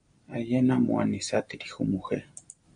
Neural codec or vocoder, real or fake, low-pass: none; real; 9.9 kHz